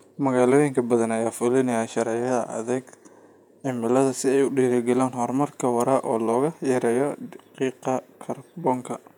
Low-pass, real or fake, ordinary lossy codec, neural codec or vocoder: 19.8 kHz; fake; none; vocoder, 48 kHz, 128 mel bands, Vocos